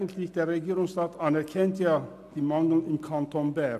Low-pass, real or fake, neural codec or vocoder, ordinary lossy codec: 14.4 kHz; real; none; MP3, 64 kbps